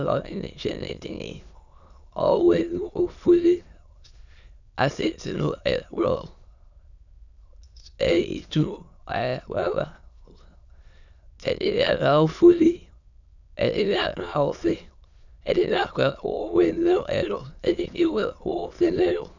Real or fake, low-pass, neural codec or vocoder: fake; 7.2 kHz; autoencoder, 22.05 kHz, a latent of 192 numbers a frame, VITS, trained on many speakers